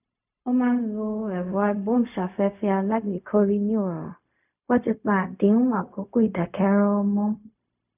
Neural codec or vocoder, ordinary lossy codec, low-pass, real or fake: codec, 16 kHz, 0.4 kbps, LongCat-Audio-Codec; none; 3.6 kHz; fake